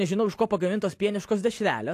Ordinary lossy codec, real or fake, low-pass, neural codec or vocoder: AAC, 64 kbps; real; 14.4 kHz; none